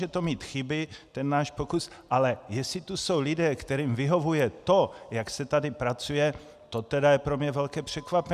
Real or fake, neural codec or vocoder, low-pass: real; none; 14.4 kHz